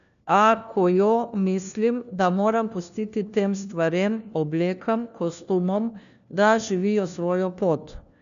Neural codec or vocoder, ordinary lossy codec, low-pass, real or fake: codec, 16 kHz, 1 kbps, FunCodec, trained on LibriTTS, 50 frames a second; none; 7.2 kHz; fake